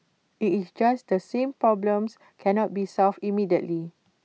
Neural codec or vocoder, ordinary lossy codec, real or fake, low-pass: none; none; real; none